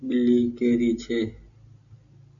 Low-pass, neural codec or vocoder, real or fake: 7.2 kHz; none; real